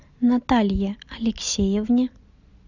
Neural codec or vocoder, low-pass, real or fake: none; 7.2 kHz; real